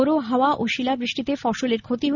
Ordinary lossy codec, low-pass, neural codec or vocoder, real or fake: none; 7.2 kHz; none; real